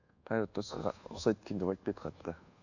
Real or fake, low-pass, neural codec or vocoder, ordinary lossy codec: fake; 7.2 kHz; codec, 24 kHz, 1.2 kbps, DualCodec; none